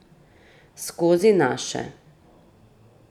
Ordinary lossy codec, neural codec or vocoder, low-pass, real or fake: none; none; 19.8 kHz; real